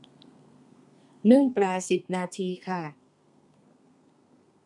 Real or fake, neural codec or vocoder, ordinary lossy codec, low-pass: fake; codec, 32 kHz, 1.9 kbps, SNAC; none; 10.8 kHz